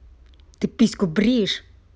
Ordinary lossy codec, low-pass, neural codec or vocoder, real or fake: none; none; none; real